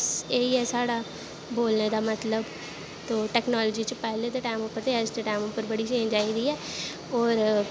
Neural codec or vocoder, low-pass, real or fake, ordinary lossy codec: none; none; real; none